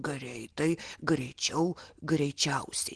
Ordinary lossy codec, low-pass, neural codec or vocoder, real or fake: Opus, 16 kbps; 9.9 kHz; none; real